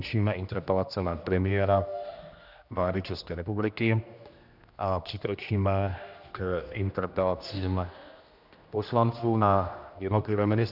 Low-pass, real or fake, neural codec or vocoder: 5.4 kHz; fake; codec, 16 kHz, 1 kbps, X-Codec, HuBERT features, trained on general audio